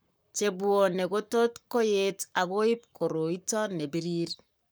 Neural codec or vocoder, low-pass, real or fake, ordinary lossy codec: codec, 44.1 kHz, 7.8 kbps, Pupu-Codec; none; fake; none